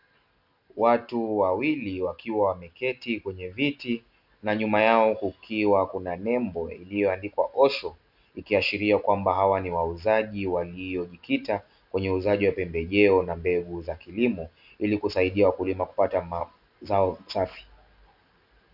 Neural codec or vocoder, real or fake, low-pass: none; real; 5.4 kHz